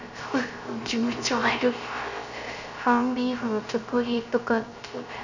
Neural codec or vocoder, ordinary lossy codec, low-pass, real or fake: codec, 16 kHz, 0.3 kbps, FocalCodec; none; 7.2 kHz; fake